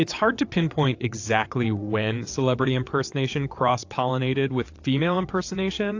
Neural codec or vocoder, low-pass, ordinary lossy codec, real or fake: vocoder, 22.05 kHz, 80 mel bands, WaveNeXt; 7.2 kHz; AAC, 48 kbps; fake